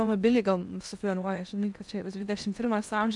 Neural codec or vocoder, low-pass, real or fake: codec, 16 kHz in and 24 kHz out, 0.8 kbps, FocalCodec, streaming, 65536 codes; 10.8 kHz; fake